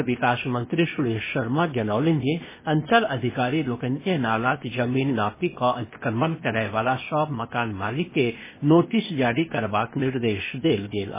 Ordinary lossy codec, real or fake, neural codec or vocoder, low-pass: MP3, 16 kbps; fake; codec, 16 kHz, 0.8 kbps, ZipCodec; 3.6 kHz